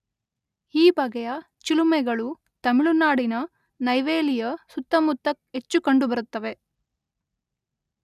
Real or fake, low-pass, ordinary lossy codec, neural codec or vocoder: real; 14.4 kHz; none; none